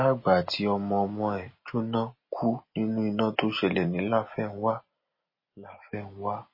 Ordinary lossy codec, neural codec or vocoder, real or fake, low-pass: MP3, 24 kbps; none; real; 5.4 kHz